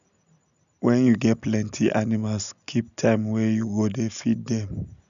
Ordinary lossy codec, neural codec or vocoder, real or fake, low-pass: none; none; real; 7.2 kHz